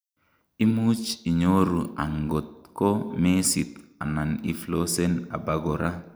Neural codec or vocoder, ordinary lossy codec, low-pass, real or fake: none; none; none; real